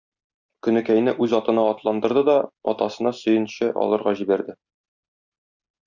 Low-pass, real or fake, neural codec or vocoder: 7.2 kHz; real; none